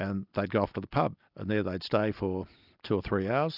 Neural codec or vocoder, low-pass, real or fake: none; 5.4 kHz; real